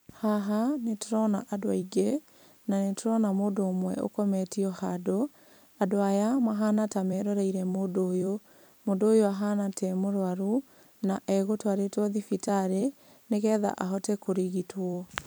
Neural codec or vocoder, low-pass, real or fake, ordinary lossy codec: vocoder, 44.1 kHz, 128 mel bands every 256 samples, BigVGAN v2; none; fake; none